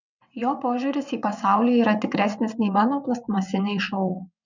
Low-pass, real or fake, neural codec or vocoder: 7.2 kHz; fake; vocoder, 22.05 kHz, 80 mel bands, WaveNeXt